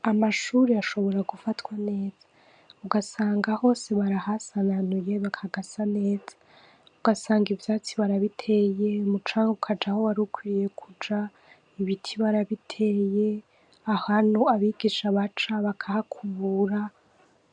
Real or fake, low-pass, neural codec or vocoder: real; 9.9 kHz; none